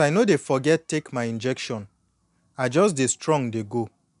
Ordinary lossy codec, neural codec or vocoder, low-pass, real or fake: none; none; 10.8 kHz; real